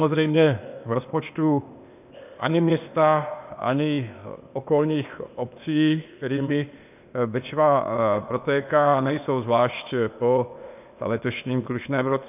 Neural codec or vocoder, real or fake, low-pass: codec, 16 kHz, 0.8 kbps, ZipCodec; fake; 3.6 kHz